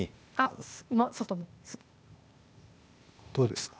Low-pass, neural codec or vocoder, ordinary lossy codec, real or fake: none; codec, 16 kHz, 0.8 kbps, ZipCodec; none; fake